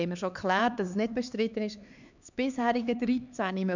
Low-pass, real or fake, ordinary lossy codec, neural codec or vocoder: 7.2 kHz; fake; none; codec, 16 kHz, 4 kbps, X-Codec, HuBERT features, trained on LibriSpeech